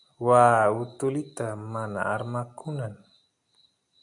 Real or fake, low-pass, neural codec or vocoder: real; 10.8 kHz; none